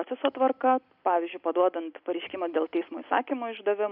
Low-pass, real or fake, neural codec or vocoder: 5.4 kHz; real; none